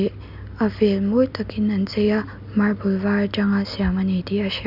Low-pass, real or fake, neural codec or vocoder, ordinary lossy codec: 5.4 kHz; real; none; none